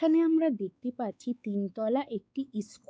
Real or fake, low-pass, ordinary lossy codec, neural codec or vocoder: fake; none; none; codec, 16 kHz, 4 kbps, X-Codec, WavLM features, trained on Multilingual LibriSpeech